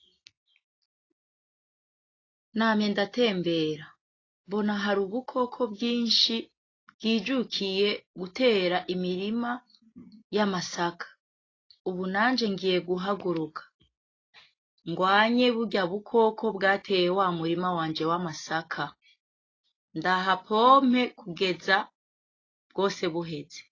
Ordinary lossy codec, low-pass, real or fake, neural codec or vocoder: AAC, 32 kbps; 7.2 kHz; real; none